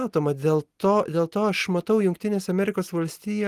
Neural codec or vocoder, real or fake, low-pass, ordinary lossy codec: none; real; 14.4 kHz; Opus, 24 kbps